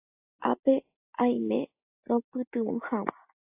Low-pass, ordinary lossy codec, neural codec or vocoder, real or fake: 3.6 kHz; AAC, 24 kbps; none; real